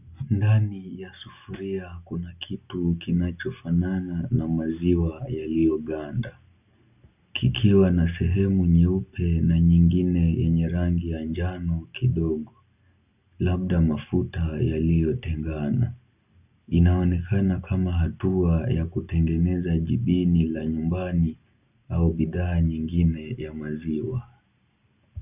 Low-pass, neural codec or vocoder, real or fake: 3.6 kHz; none; real